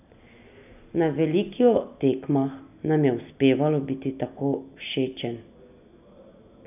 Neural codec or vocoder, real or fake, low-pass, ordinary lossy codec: none; real; 3.6 kHz; none